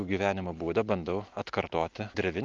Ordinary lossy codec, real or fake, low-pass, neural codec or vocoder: Opus, 32 kbps; real; 7.2 kHz; none